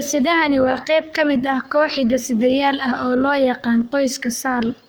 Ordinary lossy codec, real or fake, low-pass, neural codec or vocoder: none; fake; none; codec, 44.1 kHz, 3.4 kbps, Pupu-Codec